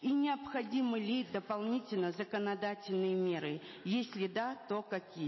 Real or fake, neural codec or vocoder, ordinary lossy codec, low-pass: real; none; MP3, 24 kbps; 7.2 kHz